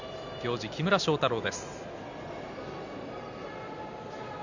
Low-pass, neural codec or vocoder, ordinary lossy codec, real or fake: 7.2 kHz; none; none; real